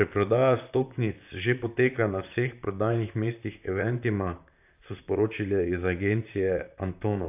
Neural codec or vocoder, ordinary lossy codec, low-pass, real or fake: vocoder, 44.1 kHz, 128 mel bands, Pupu-Vocoder; AAC, 32 kbps; 3.6 kHz; fake